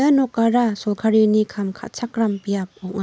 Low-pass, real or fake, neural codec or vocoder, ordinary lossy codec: none; real; none; none